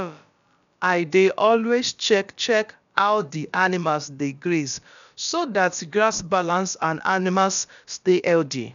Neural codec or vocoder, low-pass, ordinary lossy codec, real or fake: codec, 16 kHz, about 1 kbps, DyCAST, with the encoder's durations; 7.2 kHz; none; fake